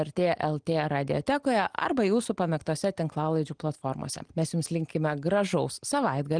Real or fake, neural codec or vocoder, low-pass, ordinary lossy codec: real; none; 9.9 kHz; Opus, 24 kbps